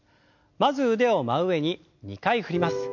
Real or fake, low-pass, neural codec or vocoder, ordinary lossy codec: real; 7.2 kHz; none; MP3, 48 kbps